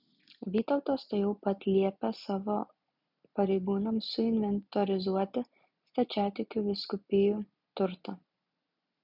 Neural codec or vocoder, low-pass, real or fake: none; 5.4 kHz; real